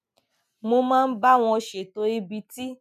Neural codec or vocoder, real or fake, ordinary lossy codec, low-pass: none; real; none; 14.4 kHz